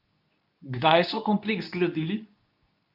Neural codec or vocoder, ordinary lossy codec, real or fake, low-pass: codec, 24 kHz, 0.9 kbps, WavTokenizer, medium speech release version 2; none; fake; 5.4 kHz